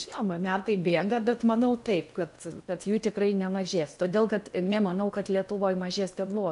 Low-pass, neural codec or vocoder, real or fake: 10.8 kHz; codec, 16 kHz in and 24 kHz out, 0.8 kbps, FocalCodec, streaming, 65536 codes; fake